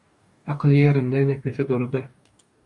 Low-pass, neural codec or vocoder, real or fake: 10.8 kHz; codec, 44.1 kHz, 2.6 kbps, DAC; fake